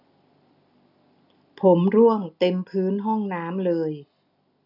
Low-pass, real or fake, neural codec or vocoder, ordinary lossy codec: 5.4 kHz; real; none; none